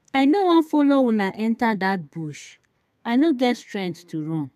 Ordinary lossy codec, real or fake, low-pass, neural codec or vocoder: none; fake; 14.4 kHz; codec, 32 kHz, 1.9 kbps, SNAC